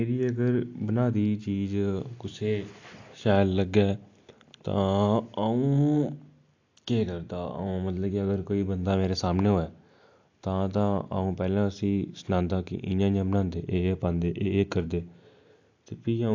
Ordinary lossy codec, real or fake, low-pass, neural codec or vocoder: AAC, 48 kbps; real; 7.2 kHz; none